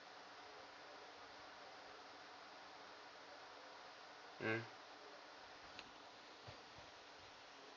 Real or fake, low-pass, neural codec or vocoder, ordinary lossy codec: real; 7.2 kHz; none; none